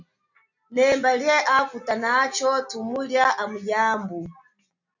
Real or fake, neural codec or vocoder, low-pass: real; none; 7.2 kHz